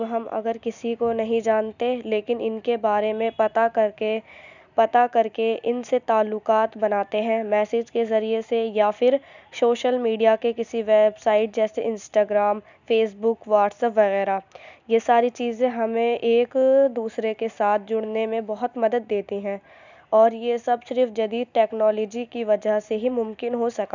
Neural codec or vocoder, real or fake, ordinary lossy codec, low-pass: none; real; none; 7.2 kHz